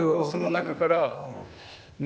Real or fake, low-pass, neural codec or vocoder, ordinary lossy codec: fake; none; codec, 16 kHz, 0.8 kbps, ZipCodec; none